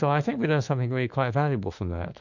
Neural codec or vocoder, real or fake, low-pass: autoencoder, 48 kHz, 32 numbers a frame, DAC-VAE, trained on Japanese speech; fake; 7.2 kHz